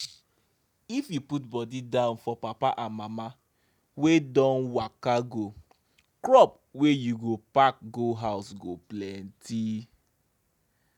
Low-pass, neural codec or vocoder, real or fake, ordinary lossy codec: 19.8 kHz; none; real; none